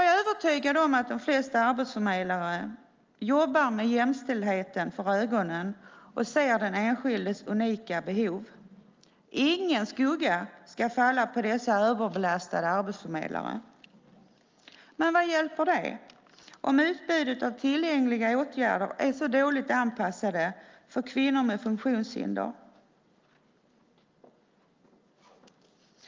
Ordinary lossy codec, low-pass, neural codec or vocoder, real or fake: Opus, 24 kbps; 7.2 kHz; none; real